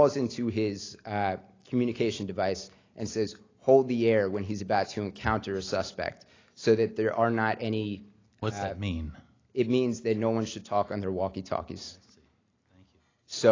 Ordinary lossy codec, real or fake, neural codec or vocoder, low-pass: AAC, 32 kbps; real; none; 7.2 kHz